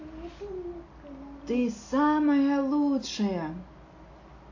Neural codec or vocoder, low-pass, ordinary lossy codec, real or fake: none; 7.2 kHz; AAC, 48 kbps; real